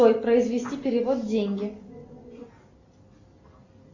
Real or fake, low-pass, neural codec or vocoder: real; 7.2 kHz; none